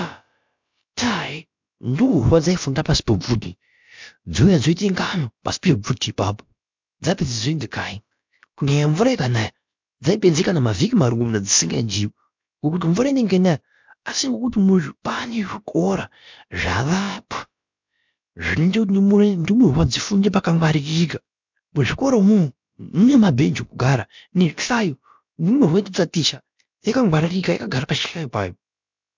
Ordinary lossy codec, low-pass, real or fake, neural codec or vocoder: MP3, 64 kbps; 7.2 kHz; fake; codec, 16 kHz, about 1 kbps, DyCAST, with the encoder's durations